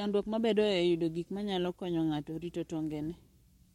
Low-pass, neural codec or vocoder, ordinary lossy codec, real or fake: 19.8 kHz; codec, 44.1 kHz, 7.8 kbps, Pupu-Codec; MP3, 64 kbps; fake